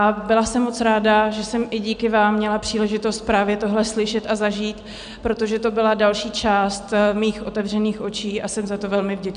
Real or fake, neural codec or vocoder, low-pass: real; none; 9.9 kHz